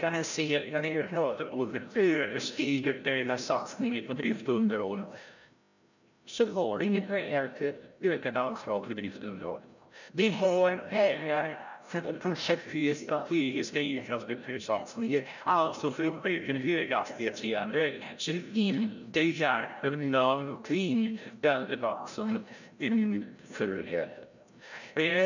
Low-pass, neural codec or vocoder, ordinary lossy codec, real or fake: 7.2 kHz; codec, 16 kHz, 0.5 kbps, FreqCodec, larger model; none; fake